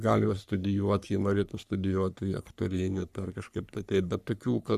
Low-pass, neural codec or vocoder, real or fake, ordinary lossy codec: 14.4 kHz; codec, 44.1 kHz, 3.4 kbps, Pupu-Codec; fake; Opus, 64 kbps